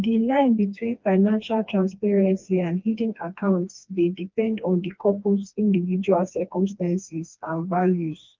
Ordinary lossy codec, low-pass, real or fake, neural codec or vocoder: Opus, 24 kbps; 7.2 kHz; fake; codec, 16 kHz, 2 kbps, FreqCodec, smaller model